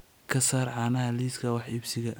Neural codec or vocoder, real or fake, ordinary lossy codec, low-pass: none; real; none; none